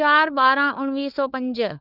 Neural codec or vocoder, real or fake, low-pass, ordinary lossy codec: codec, 16 kHz, 4 kbps, FunCodec, trained on LibriTTS, 50 frames a second; fake; 5.4 kHz; none